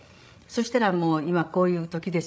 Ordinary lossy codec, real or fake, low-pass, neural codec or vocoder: none; fake; none; codec, 16 kHz, 16 kbps, FreqCodec, larger model